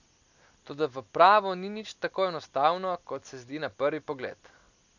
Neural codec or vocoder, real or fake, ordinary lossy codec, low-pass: none; real; none; 7.2 kHz